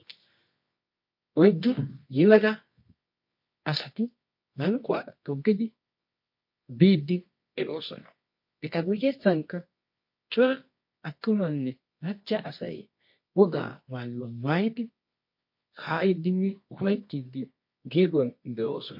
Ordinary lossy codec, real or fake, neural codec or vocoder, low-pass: MP3, 32 kbps; fake; codec, 24 kHz, 0.9 kbps, WavTokenizer, medium music audio release; 5.4 kHz